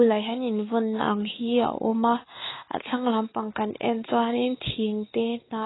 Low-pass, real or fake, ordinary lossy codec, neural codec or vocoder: 7.2 kHz; fake; AAC, 16 kbps; codec, 16 kHz, 4 kbps, X-Codec, WavLM features, trained on Multilingual LibriSpeech